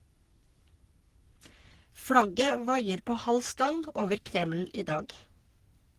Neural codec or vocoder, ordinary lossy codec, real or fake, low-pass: codec, 44.1 kHz, 3.4 kbps, Pupu-Codec; Opus, 24 kbps; fake; 14.4 kHz